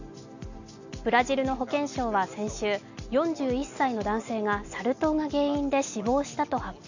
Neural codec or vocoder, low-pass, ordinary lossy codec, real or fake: none; 7.2 kHz; none; real